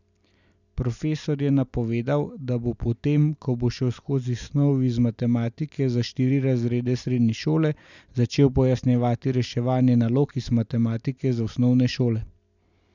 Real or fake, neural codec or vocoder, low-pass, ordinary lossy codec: real; none; 7.2 kHz; none